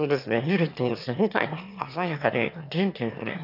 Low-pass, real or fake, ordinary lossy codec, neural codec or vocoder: 5.4 kHz; fake; none; autoencoder, 22.05 kHz, a latent of 192 numbers a frame, VITS, trained on one speaker